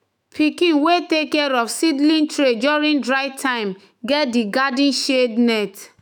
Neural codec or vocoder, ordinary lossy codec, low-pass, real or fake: autoencoder, 48 kHz, 128 numbers a frame, DAC-VAE, trained on Japanese speech; none; none; fake